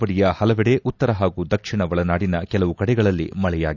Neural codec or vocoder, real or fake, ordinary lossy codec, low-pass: none; real; none; 7.2 kHz